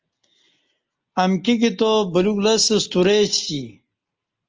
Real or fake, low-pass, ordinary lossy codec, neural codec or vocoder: real; 7.2 kHz; Opus, 24 kbps; none